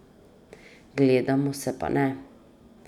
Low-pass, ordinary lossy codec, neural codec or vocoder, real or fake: 19.8 kHz; none; none; real